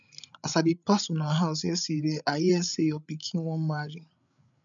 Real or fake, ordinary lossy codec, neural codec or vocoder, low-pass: fake; none; codec, 16 kHz, 8 kbps, FreqCodec, larger model; 7.2 kHz